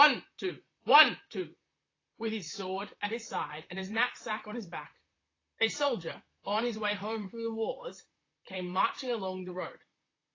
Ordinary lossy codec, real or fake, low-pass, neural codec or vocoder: AAC, 32 kbps; fake; 7.2 kHz; vocoder, 44.1 kHz, 128 mel bands, Pupu-Vocoder